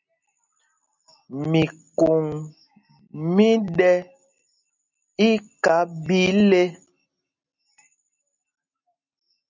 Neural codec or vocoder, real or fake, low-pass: none; real; 7.2 kHz